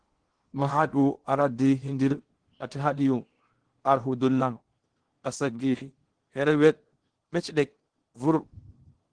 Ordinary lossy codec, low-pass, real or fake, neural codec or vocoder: Opus, 16 kbps; 9.9 kHz; fake; codec, 16 kHz in and 24 kHz out, 0.8 kbps, FocalCodec, streaming, 65536 codes